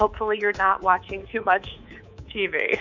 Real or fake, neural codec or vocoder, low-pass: fake; codec, 24 kHz, 3.1 kbps, DualCodec; 7.2 kHz